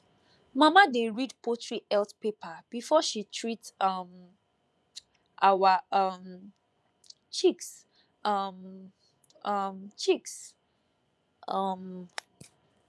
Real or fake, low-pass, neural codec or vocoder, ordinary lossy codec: fake; none; vocoder, 24 kHz, 100 mel bands, Vocos; none